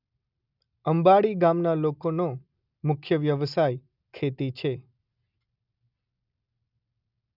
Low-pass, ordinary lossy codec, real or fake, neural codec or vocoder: 5.4 kHz; none; real; none